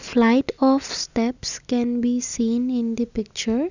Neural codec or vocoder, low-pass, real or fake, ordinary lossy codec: none; 7.2 kHz; real; none